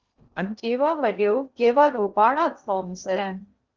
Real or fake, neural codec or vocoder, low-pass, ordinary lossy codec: fake; codec, 16 kHz in and 24 kHz out, 0.6 kbps, FocalCodec, streaming, 2048 codes; 7.2 kHz; Opus, 32 kbps